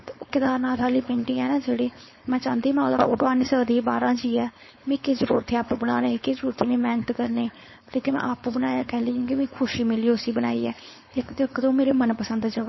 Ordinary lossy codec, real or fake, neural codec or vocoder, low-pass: MP3, 24 kbps; fake; codec, 16 kHz, 4.8 kbps, FACodec; 7.2 kHz